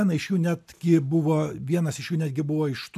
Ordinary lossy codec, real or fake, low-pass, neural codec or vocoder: AAC, 96 kbps; real; 14.4 kHz; none